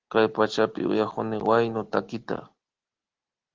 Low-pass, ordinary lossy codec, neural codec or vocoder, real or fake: 7.2 kHz; Opus, 16 kbps; none; real